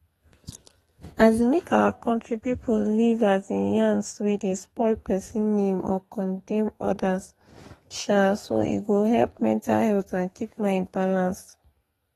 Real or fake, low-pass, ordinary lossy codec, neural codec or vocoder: fake; 14.4 kHz; AAC, 32 kbps; codec, 32 kHz, 1.9 kbps, SNAC